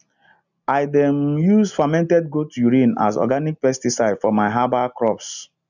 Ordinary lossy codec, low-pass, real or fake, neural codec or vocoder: none; 7.2 kHz; real; none